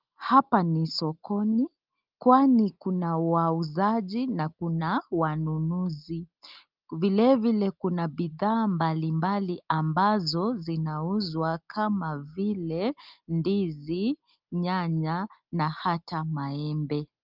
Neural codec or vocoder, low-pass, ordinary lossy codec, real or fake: none; 5.4 kHz; Opus, 24 kbps; real